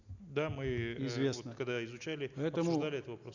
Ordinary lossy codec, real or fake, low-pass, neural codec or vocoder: none; real; 7.2 kHz; none